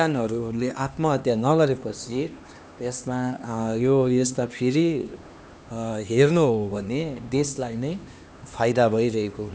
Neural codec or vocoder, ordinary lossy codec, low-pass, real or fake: codec, 16 kHz, 2 kbps, X-Codec, HuBERT features, trained on LibriSpeech; none; none; fake